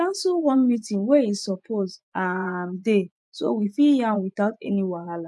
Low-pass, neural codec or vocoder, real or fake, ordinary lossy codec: none; vocoder, 24 kHz, 100 mel bands, Vocos; fake; none